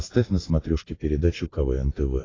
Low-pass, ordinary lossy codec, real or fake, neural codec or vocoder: 7.2 kHz; AAC, 32 kbps; real; none